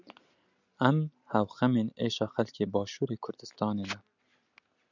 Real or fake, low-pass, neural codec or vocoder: real; 7.2 kHz; none